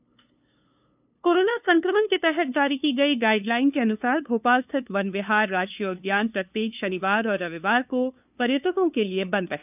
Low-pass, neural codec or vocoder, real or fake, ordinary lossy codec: 3.6 kHz; codec, 16 kHz, 2 kbps, FunCodec, trained on LibriTTS, 25 frames a second; fake; none